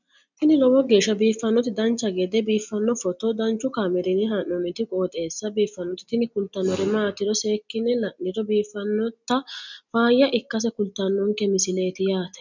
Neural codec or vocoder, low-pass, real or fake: none; 7.2 kHz; real